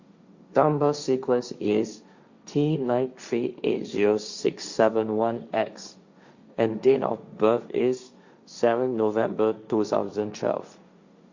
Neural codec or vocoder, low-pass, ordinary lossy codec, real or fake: codec, 16 kHz, 1.1 kbps, Voila-Tokenizer; 7.2 kHz; Opus, 64 kbps; fake